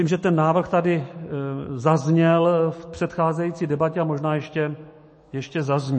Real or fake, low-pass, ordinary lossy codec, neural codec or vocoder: fake; 10.8 kHz; MP3, 32 kbps; autoencoder, 48 kHz, 128 numbers a frame, DAC-VAE, trained on Japanese speech